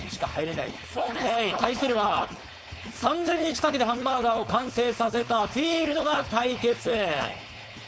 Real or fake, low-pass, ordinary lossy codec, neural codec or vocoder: fake; none; none; codec, 16 kHz, 4.8 kbps, FACodec